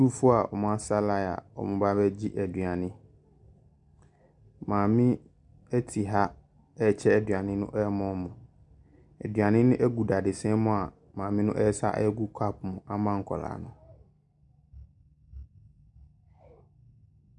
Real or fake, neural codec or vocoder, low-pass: real; none; 10.8 kHz